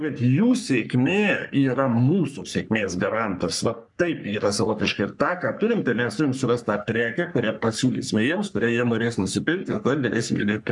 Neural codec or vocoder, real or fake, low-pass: codec, 44.1 kHz, 3.4 kbps, Pupu-Codec; fake; 10.8 kHz